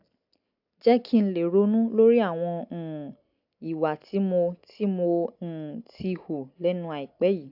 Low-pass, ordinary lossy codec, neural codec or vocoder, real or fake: 5.4 kHz; AAC, 48 kbps; none; real